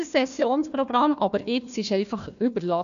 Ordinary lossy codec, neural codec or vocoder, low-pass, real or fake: none; codec, 16 kHz, 1 kbps, FunCodec, trained on Chinese and English, 50 frames a second; 7.2 kHz; fake